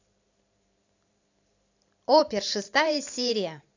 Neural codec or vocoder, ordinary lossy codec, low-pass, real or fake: vocoder, 44.1 kHz, 128 mel bands every 512 samples, BigVGAN v2; AAC, 48 kbps; 7.2 kHz; fake